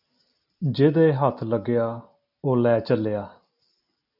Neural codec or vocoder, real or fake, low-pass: none; real; 5.4 kHz